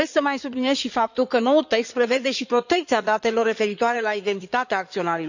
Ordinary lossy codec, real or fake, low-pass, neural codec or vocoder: none; fake; 7.2 kHz; codec, 16 kHz in and 24 kHz out, 2.2 kbps, FireRedTTS-2 codec